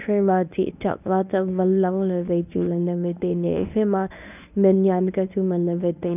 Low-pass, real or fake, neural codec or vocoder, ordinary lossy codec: 3.6 kHz; fake; codec, 24 kHz, 0.9 kbps, WavTokenizer, medium speech release version 1; none